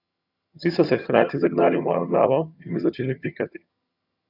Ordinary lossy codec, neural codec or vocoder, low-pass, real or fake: none; vocoder, 22.05 kHz, 80 mel bands, HiFi-GAN; 5.4 kHz; fake